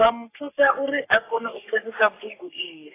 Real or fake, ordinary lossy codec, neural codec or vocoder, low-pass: fake; AAC, 24 kbps; codec, 44.1 kHz, 3.4 kbps, Pupu-Codec; 3.6 kHz